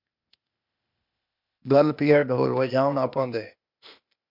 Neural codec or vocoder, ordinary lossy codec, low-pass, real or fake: codec, 16 kHz, 0.8 kbps, ZipCodec; MP3, 48 kbps; 5.4 kHz; fake